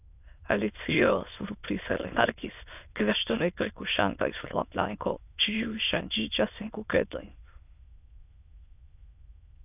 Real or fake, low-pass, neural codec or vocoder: fake; 3.6 kHz; autoencoder, 22.05 kHz, a latent of 192 numbers a frame, VITS, trained on many speakers